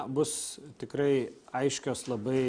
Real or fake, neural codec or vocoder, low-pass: real; none; 9.9 kHz